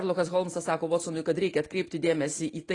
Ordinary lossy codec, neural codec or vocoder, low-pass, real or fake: AAC, 32 kbps; none; 10.8 kHz; real